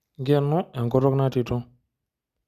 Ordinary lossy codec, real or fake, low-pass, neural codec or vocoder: Opus, 64 kbps; real; 14.4 kHz; none